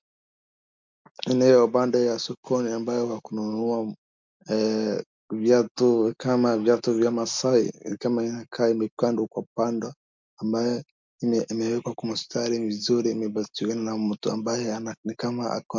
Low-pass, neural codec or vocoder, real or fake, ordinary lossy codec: 7.2 kHz; none; real; MP3, 64 kbps